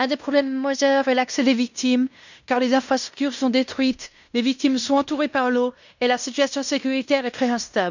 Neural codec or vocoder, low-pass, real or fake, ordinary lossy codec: codec, 16 kHz in and 24 kHz out, 0.9 kbps, LongCat-Audio-Codec, fine tuned four codebook decoder; 7.2 kHz; fake; none